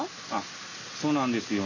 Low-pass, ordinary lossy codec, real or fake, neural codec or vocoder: 7.2 kHz; none; real; none